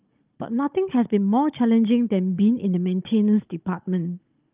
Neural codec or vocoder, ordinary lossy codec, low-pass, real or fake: codec, 16 kHz, 16 kbps, FunCodec, trained on Chinese and English, 50 frames a second; Opus, 24 kbps; 3.6 kHz; fake